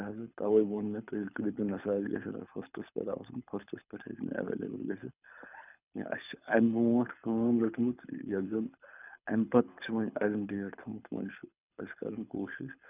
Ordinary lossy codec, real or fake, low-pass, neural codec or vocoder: none; fake; 3.6 kHz; codec, 24 kHz, 6 kbps, HILCodec